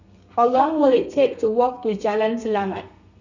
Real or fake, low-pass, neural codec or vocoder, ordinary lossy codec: fake; 7.2 kHz; codec, 32 kHz, 1.9 kbps, SNAC; none